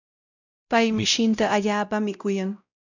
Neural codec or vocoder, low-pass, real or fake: codec, 16 kHz, 0.5 kbps, X-Codec, WavLM features, trained on Multilingual LibriSpeech; 7.2 kHz; fake